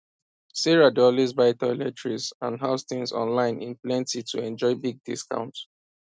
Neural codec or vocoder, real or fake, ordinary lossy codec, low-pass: none; real; none; none